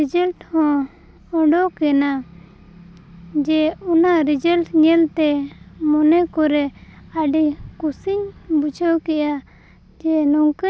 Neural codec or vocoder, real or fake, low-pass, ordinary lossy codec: none; real; none; none